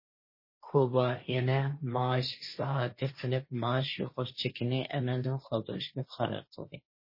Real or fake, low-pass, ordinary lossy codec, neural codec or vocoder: fake; 5.4 kHz; MP3, 24 kbps; codec, 16 kHz, 1.1 kbps, Voila-Tokenizer